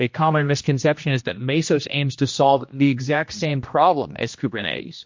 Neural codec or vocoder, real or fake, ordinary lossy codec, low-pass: codec, 16 kHz, 1 kbps, X-Codec, HuBERT features, trained on general audio; fake; MP3, 48 kbps; 7.2 kHz